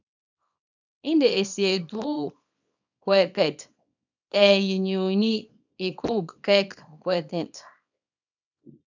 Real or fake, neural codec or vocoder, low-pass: fake; codec, 24 kHz, 0.9 kbps, WavTokenizer, small release; 7.2 kHz